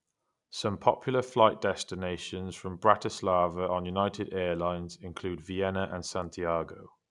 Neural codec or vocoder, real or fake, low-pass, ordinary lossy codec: none; real; 10.8 kHz; none